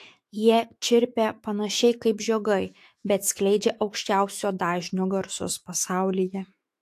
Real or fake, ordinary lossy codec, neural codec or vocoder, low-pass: fake; AAC, 64 kbps; autoencoder, 48 kHz, 128 numbers a frame, DAC-VAE, trained on Japanese speech; 14.4 kHz